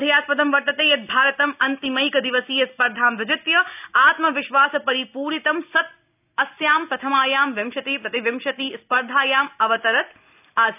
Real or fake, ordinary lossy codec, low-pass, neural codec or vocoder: real; none; 3.6 kHz; none